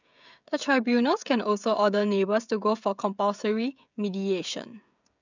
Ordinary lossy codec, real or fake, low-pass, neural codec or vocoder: none; fake; 7.2 kHz; codec, 16 kHz, 16 kbps, FreqCodec, smaller model